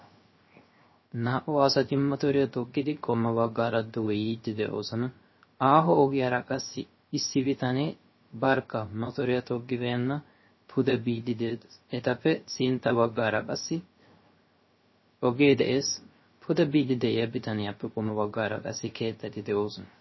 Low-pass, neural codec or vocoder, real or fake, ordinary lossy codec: 7.2 kHz; codec, 16 kHz, 0.3 kbps, FocalCodec; fake; MP3, 24 kbps